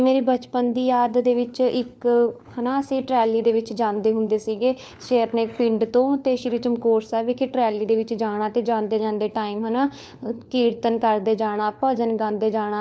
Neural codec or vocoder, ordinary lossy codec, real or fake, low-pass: codec, 16 kHz, 4 kbps, FunCodec, trained on LibriTTS, 50 frames a second; none; fake; none